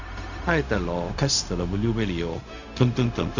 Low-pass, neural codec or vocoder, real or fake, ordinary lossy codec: 7.2 kHz; codec, 16 kHz, 0.4 kbps, LongCat-Audio-Codec; fake; none